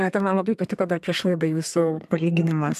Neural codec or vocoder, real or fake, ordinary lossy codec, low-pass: codec, 32 kHz, 1.9 kbps, SNAC; fake; AAC, 96 kbps; 14.4 kHz